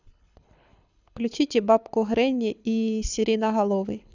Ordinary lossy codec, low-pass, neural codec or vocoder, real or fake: none; 7.2 kHz; codec, 24 kHz, 6 kbps, HILCodec; fake